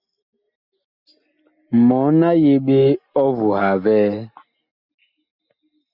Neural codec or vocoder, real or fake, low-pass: none; real; 5.4 kHz